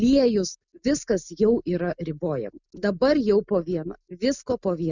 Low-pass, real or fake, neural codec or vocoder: 7.2 kHz; real; none